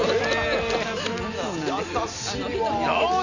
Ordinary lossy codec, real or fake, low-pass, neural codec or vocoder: none; real; 7.2 kHz; none